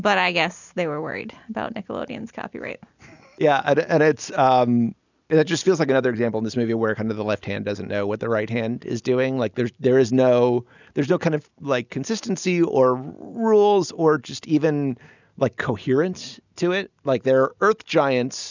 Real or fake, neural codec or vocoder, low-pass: real; none; 7.2 kHz